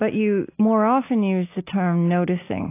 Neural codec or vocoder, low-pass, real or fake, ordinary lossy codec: none; 3.6 kHz; real; AAC, 24 kbps